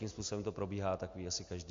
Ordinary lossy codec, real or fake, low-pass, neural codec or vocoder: MP3, 48 kbps; real; 7.2 kHz; none